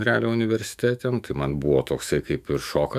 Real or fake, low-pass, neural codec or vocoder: fake; 14.4 kHz; autoencoder, 48 kHz, 128 numbers a frame, DAC-VAE, trained on Japanese speech